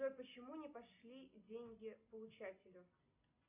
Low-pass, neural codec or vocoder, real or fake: 3.6 kHz; none; real